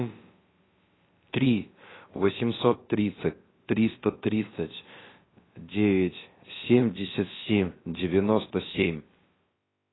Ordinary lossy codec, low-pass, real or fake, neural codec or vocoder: AAC, 16 kbps; 7.2 kHz; fake; codec, 16 kHz, about 1 kbps, DyCAST, with the encoder's durations